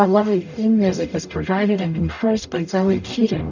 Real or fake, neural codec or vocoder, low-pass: fake; codec, 44.1 kHz, 0.9 kbps, DAC; 7.2 kHz